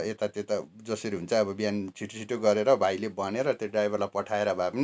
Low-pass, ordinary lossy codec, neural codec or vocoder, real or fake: none; none; none; real